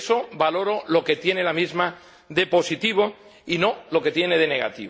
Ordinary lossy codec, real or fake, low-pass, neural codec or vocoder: none; real; none; none